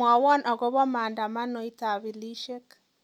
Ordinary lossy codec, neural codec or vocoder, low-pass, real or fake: none; none; 19.8 kHz; real